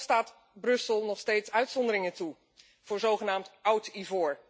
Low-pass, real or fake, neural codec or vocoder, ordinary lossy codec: none; real; none; none